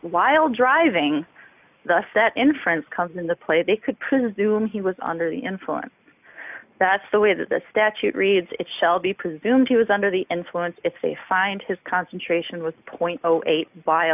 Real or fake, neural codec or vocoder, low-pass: real; none; 3.6 kHz